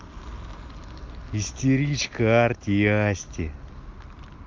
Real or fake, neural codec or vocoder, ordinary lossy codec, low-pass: real; none; Opus, 24 kbps; 7.2 kHz